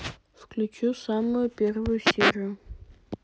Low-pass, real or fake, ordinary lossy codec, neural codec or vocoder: none; real; none; none